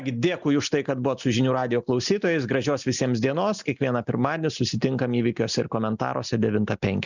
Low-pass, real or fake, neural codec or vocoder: 7.2 kHz; real; none